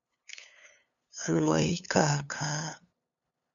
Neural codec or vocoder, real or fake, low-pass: codec, 16 kHz, 2 kbps, FunCodec, trained on LibriTTS, 25 frames a second; fake; 7.2 kHz